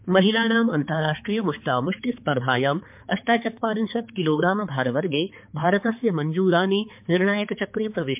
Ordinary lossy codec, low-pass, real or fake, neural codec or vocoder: MP3, 32 kbps; 3.6 kHz; fake; codec, 16 kHz, 4 kbps, X-Codec, HuBERT features, trained on balanced general audio